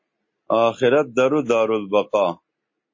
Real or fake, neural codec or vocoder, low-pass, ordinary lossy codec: real; none; 7.2 kHz; MP3, 32 kbps